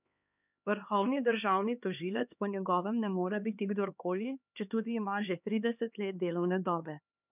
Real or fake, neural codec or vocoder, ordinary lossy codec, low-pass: fake; codec, 16 kHz, 2 kbps, X-Codec, HuBERT features, trained on LibriSpeech; none; 3.6 kHz